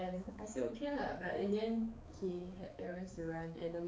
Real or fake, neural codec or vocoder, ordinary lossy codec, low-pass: fake; codec, 16 kHz, 4 kbps, X-Codec, HuBERT features, trained on balanced general audio; none; none